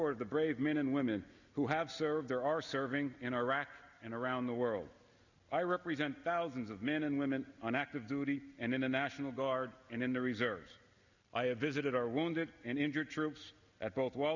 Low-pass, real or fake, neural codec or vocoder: 7.2 kHz; real; none